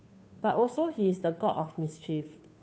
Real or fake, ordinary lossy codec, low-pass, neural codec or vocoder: fake; none; none; codec, 16 kHz, 2 kbps, FunCodec, trained on Chinese and English, 25 frames a second